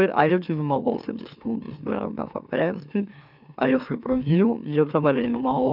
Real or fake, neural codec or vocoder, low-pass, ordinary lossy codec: fake; autoencoder, 44.1 kHz, a latent of 192 numbers a frame, MeloTTS; 5.4 kHz; none